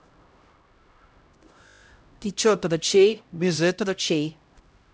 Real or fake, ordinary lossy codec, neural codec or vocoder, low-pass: fake; none; codec, 16 kHz, 0.5 kbps, X-Codec, HuBERT features, trained on LibriSpeech; none